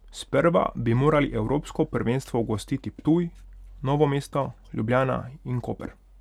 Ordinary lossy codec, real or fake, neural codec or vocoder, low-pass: none; real; none; 19.8 kHz